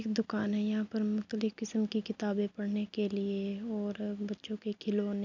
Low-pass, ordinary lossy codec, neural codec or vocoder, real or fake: 7.2 kHz; none; none; real